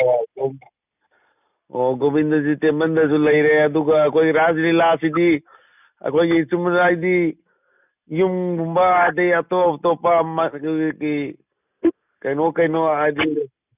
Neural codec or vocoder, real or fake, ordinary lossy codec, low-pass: none; real; none; 3.6 kHz